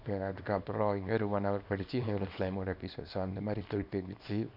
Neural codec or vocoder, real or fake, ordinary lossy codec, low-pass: codec, 24 kHz, 0.9 kbps, WavTokenizer, small release; fake; none; 5.4 kHz